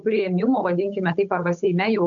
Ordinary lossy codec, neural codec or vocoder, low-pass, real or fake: AAC, 64 kbps; codec, 16 kHz, 8 kbps, FunCodec, trained on Chinese and English, 25 frames a second; 7.2 kHz; fake